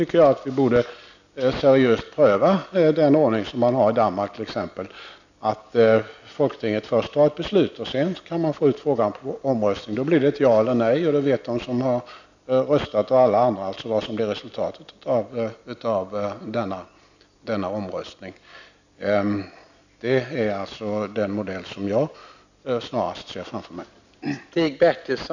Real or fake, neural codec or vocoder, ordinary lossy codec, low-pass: real; none; none; 7.2 kHz